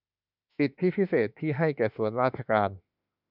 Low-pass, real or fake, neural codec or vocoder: 5.4 kHz; fake; autoencoder, 48 kHz, 32 numbers a frame, DAC-VAE, trained on Japanese speech